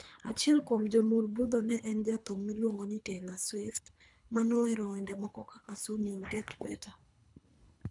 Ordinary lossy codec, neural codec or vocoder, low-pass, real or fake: none; codec, 24 kHz, 3 kbps, HILCodec; 10.8 kHz; fake